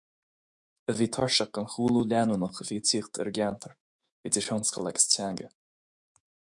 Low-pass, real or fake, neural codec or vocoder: 10.8 kHz; fake; autoencoder, 48 kHz, 128 numbers a frame, DAC-VAE, trained on Japanese speech